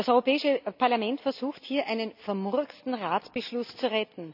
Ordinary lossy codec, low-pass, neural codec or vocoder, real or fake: none; 5.4 kHz; none; real